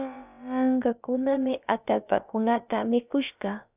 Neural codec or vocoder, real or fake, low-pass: codec, 16 kHz, about 1 kbps, DyCAST, with the encoder's durations; fake; 3.6 kHz